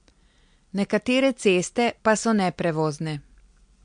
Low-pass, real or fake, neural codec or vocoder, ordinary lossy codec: 9.9 kHz; real; none; MP3, 64 kbps